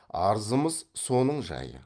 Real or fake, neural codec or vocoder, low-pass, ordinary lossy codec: real; none; 9.9 kHz; Opus, 32 kbps